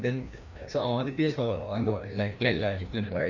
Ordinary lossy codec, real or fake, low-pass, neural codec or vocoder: none; fake; 7.2 kHz; codec, 16 kHz, 1 kbps, FreqCodec, larger model